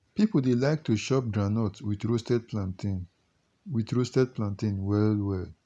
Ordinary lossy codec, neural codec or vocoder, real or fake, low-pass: none; none; real; none